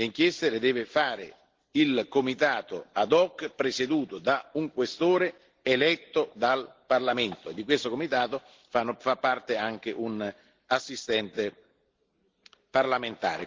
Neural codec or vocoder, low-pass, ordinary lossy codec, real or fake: none; 7.2 kHz; Opus, 16 kbps; real